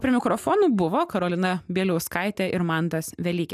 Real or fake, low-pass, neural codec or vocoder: real; 14.4 kHz; none